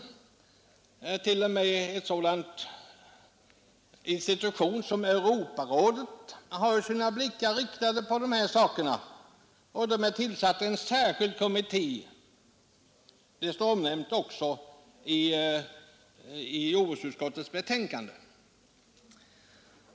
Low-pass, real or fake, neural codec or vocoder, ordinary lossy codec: none; real; none; none